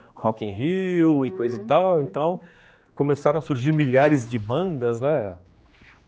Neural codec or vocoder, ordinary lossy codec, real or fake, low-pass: codec, 16 kHz, 2 kbps, X-Codec, HuBERT features, trained on general audio; none; fake; none